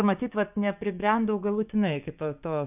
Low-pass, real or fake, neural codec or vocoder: 3.6 kHz; fake; codec, 16 kHz, 6 kbps, DAC